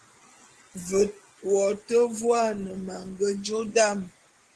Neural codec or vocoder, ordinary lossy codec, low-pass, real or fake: none; Opus, 16 kbps; 10.8 kHz; real